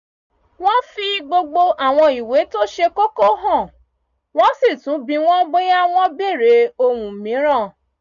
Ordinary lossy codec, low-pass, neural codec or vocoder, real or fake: none; 7.2 kHz; none; real